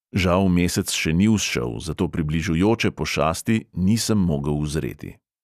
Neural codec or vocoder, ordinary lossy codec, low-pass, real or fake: none; none; 14.4 kHz; real